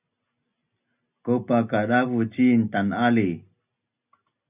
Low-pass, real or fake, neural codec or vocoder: 3.6 kHz; real; none